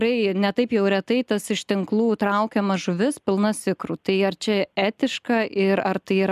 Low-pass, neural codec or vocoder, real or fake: 14.4 kHz; none; real